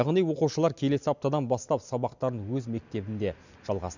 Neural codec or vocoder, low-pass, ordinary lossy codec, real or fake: none; 7.2 kHz; none; real